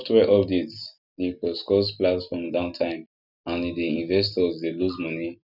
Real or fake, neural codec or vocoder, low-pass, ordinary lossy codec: real; none; 5.4 kHz; none